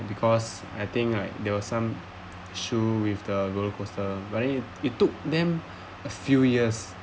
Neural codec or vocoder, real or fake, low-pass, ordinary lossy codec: none; real; none; none